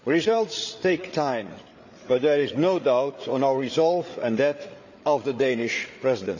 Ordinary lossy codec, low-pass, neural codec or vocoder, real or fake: none; 7.2 kHz; codec, 16 kHz, 8 kbps, FreqCodec, larger model; fake